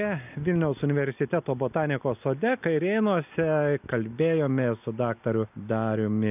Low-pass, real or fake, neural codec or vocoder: 3.6 kHz; real; none